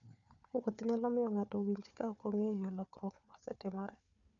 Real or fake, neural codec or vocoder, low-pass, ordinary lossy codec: fake; codec, 16 kHz, 4 kbps, FunCodec, trained on Chinese and English, 50 frames a second; 7.2 kHz; none